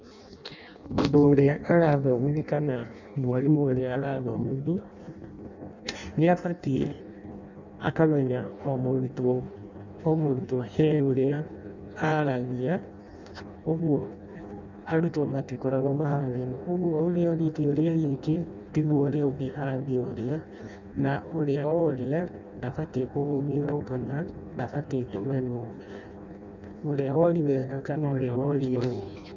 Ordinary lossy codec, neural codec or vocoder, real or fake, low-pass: none; codec, 16 kHz in and 24 kHz out, 0.6 kbps, FireRedTTS-2 codec; fake; 7.2 kHz